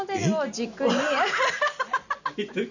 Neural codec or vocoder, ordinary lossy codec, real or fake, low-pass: none; none; real; 7.2 kHz